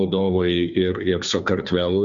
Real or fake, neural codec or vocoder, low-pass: fake; codec, 16 kHz, 2 kbps, FunCodec, trained on Chinese and English, 25 frames a second; 7.2 kHz